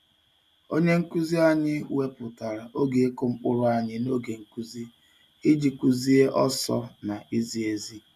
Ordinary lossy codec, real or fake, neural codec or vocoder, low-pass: none; real; none; 14.4 kHz